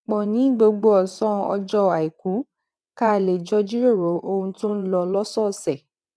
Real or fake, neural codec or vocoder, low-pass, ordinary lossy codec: fake; vocoder, 22.05 kHz, 80 mel bands, WaveNeXt; none; none